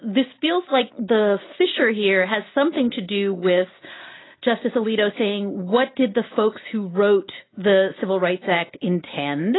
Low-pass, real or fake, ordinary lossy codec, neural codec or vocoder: 7.2 kHz; real; AAC, 16 kbps; none